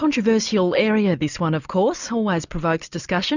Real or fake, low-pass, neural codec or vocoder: real; 7.2 kHz; none